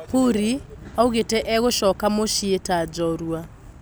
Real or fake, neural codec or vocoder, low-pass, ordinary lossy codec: real; none; none; none